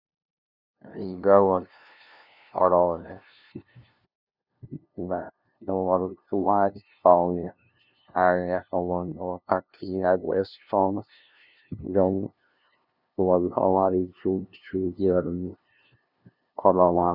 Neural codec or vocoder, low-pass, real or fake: codec, 16 kHz, 0.5 kbps, FunCodec, trained on LibriTTS, 25 frames a second; 5.4 kHz; fake